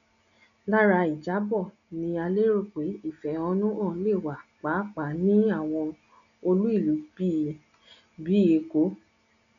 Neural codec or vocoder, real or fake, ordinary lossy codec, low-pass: none; real; none; 7.2 kHz